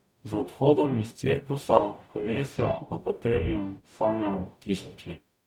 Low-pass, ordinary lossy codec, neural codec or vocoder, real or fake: 19.8 kHz; none; codec, 44.1 kHz, 0.9 kbps, DAC; fake